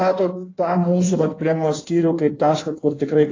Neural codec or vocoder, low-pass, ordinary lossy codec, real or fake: codec, 16 kHz in and 24 kHz out, 1.1 kbps, FireRedTTS-2 codec; 7.2 kHz; AAC, 32 kbps; fake